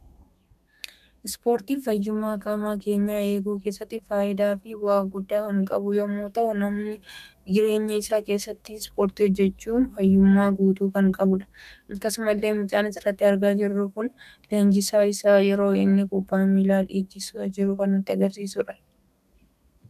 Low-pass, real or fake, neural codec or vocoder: 14.4 kHz; fake; codec, 32 kHz, 1.9 kbps, SNAC